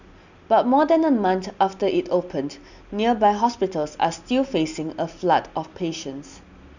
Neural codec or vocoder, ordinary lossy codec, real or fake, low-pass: none; none; real; 7.2 kHz